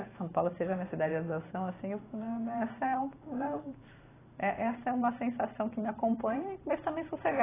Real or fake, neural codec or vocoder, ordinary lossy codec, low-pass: fake; vocoder, 44.1 kHz, 128 mel bands every 512 samples, BigVGAN v2; AAC, 16 kbps; 3.6 kHz